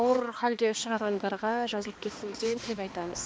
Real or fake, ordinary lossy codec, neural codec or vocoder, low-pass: fake; none; codec, 16 kHz, 2 kbps, X-Codec, HuBERT features, trained on balanced general audio; none